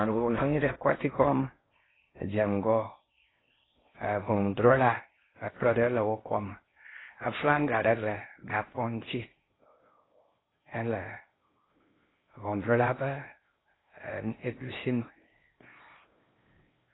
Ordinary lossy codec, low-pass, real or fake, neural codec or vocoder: AAC, 16 kbps; 7.2 kHz; fake; codec, 16 kHz in and 24 kHz out, 0.6 kbps, FocalCodec, streaming, 4096 codes